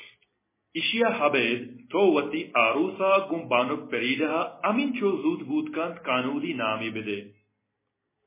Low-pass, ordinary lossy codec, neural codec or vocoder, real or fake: 3.6 kHz; MP3, 16 kbps; none; real